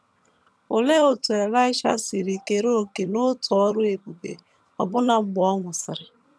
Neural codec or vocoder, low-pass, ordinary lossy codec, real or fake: vocoder, 22.05 kHz, 80 mel bands, HiFi-GAN; none; none; fake